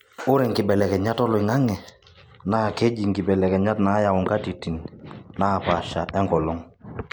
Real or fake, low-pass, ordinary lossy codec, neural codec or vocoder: real; none; none; none